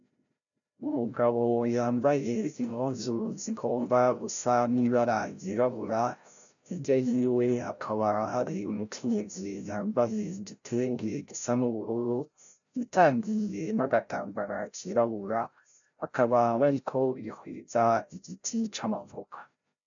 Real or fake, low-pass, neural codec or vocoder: fake; 7.2 kHz; codec, 16 kHz, 0.5 kbps, FreqCodec, larger model